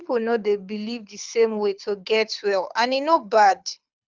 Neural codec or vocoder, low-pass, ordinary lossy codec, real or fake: codec, 24 kHz, 6 kbps, HILCodec; 7.2 kHz; Opus, 32 kbps; fake